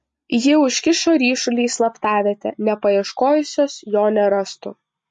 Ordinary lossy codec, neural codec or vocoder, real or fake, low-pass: MP3, 48 kbps; none; real; 10.8 kHz